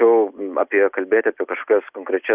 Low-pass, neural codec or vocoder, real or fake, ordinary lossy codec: 3.6 kHz; none; real; Opus, 64 kbps